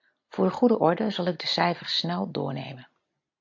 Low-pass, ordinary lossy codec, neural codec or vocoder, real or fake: 7.2 kHz; AAC, 48 kbps; none; real